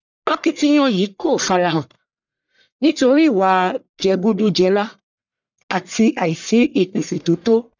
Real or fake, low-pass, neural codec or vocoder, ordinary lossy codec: fake; 7.2 kHz; codec, 44.1 kHz, 1.7 kbps, Pupu-Codec; none